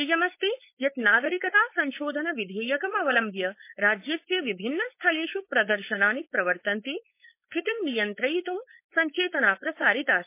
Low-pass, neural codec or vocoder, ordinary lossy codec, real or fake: 3.6 kHz; codec, 16 kHz, 4.8 kbps, FACodec; MP3, 24 kbps; fake